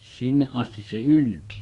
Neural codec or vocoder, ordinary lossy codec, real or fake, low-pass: codec, 24 kHz, 1 kbps, SNAC; Opus, 64 kbps; fake; 10.8 kHz